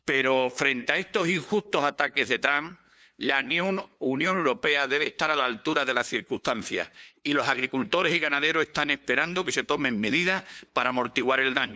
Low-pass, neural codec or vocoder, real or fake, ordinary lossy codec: none; codec, 16 kHz, 2 kbps, FunCodec, trained on LibriTTS, 25 frames a second; fake; none